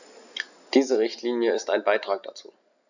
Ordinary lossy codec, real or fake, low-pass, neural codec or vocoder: none; real; 7.2 kHz; none